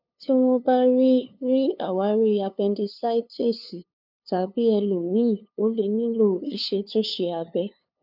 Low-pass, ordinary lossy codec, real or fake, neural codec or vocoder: 5.4 kHz; none; fake; codec, 16 kHz, 2 kbps, FunCodec, trained on LibriTTS, 25 frames a second